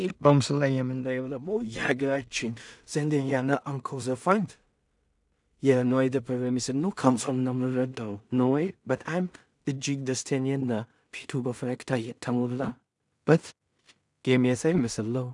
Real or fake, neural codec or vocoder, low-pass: fake; codec, 16 kHz in and 24 kHz out, 0.4 kbps, LongCat-Audio-Codec, two codebook decoder; 10.8 kHz